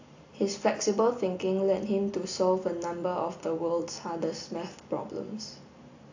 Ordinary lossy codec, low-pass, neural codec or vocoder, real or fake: AAC, 48 kbps; 7.2 kHz; none; real